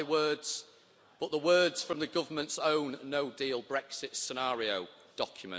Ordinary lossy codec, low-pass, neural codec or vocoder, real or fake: none; none; none; real